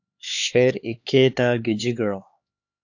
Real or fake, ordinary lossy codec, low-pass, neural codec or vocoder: fake; AAC, 48 kbps; 7.2 kHz; codec, 16 kHz, 4 kbps, X-Codec, HuBERT features, trained on LibriSpeech